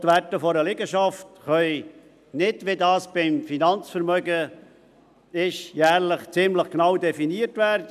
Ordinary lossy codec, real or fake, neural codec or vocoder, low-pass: none; real; none; 14.4 kHz